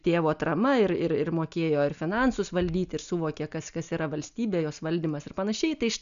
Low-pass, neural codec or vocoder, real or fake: 7.2 kHz; none; real